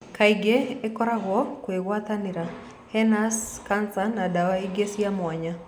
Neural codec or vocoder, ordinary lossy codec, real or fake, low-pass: none; none; real; 19.8 kHz